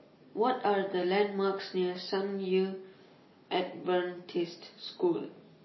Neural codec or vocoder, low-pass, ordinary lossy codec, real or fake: none; 7.2 kHz; MP3, 24 kbps; real